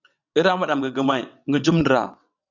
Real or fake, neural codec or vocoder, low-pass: fake; vocoder, 22.05 kHz, 80 mel bands, WaveNeXt; 7.2 kHz